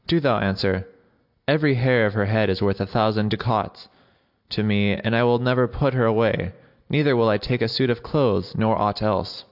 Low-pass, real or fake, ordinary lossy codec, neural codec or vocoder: 5.4 kHz; real; AAC, 48 kbps; none